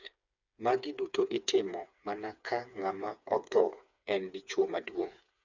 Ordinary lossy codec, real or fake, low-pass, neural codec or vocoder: none; fake; 7.2 kHz; codec, 16 kHz, 4 kbps, FreqCodec, smaller model